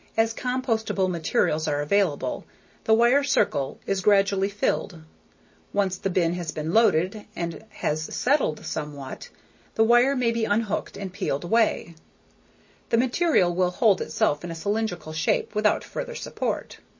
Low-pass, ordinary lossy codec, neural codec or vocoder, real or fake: 7.2 kHz; MP3, 32 kbps; none; real